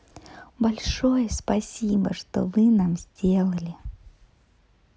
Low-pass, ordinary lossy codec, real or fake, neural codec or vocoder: none; none; real; none